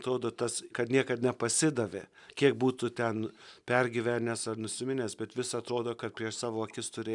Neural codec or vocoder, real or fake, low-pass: none; real; 10.8 kHz